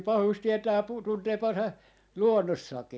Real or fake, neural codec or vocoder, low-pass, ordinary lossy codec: real; none; none; none